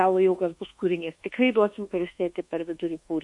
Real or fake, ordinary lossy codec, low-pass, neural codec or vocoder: fake; MP3, 48 kbps; 10.8 kHz; codec, 24 kHz, 1.2 kbps, DualCodec